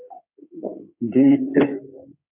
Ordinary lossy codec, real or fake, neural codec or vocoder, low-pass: MP3, 32 kbps; fake; codec, 16 kHz in and 24 kHz out, 2.2 kbps, FireRedTTS-2 codec; 3.6 kHz